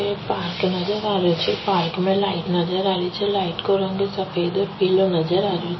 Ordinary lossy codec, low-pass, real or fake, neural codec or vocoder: MP3, 24 kbps; 7.2 kHz; real; none